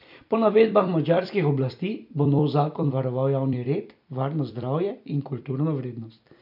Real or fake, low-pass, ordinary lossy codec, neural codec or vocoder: fake; 5.4 kHz; none; vocoder, 44.1 kHz, 128 mel bands every 256 samples, BigVGAN v2